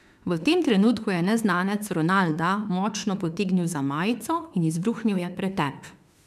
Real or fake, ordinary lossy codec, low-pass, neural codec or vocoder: fake; none; 14.4 kHz; autoencoder, 48 kHz, 32 numbers a frame, DAC-VAE, trained on Japanese speech